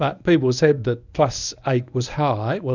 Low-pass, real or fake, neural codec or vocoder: 7.2 kHz; fake; codec, 24 kHz, 0.9 kbps, WavTokenizer, medium speech release version 1